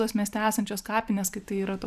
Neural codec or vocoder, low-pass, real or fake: vocoder, 44.1 kHz, 128 mel bands every 512 samples, BigVGAN v2; 14.4 kHz; fake